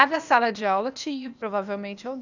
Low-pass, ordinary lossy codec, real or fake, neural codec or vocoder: 7.2 kHz; none; fake; codec, 16 kHz, 0.8 kbps, ZipCodec